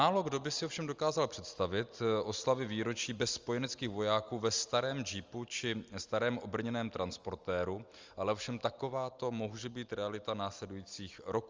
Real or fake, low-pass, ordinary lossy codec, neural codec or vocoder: real; 7.2 kHz; Opus, 32 kbps; none